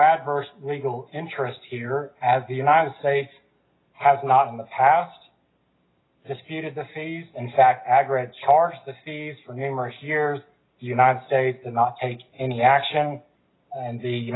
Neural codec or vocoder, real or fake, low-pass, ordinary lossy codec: none; real; 7.2 kHz; AAC, 16 kbps